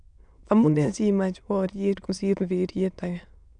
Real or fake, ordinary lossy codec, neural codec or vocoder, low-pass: fake; none; autoencoder, 22.05 kHz, a latent of 192 numbers a frame, VITS, trained on many speakers; 9.9 kHz